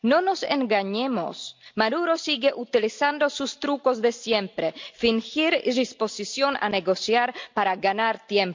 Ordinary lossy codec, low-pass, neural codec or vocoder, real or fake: MP3, 64 kbps; 7.2 kHz; none; real